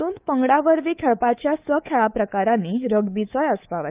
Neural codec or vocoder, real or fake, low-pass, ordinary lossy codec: codec, 24 kHz, 3.1 kbps, DualCodec; fake; 3.6 kHz; Opus, 32 kbps